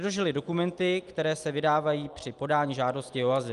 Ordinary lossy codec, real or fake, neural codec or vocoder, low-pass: Opus, 32 kbps; real; none; 10.8 kHz